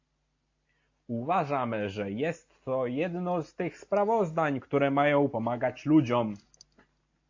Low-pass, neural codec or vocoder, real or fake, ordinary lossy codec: 7.2 kHz; none; real; AAC, 48 kbps